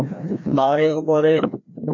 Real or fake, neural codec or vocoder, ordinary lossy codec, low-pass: fake; codec, 16 kHz, 1 kbps, FreqCodec, larger model; MP3, 64 kbps; 7.2 kHz